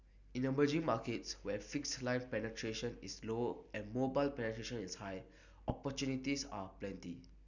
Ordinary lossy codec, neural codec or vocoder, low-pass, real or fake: none; none; 7.2 kHz; real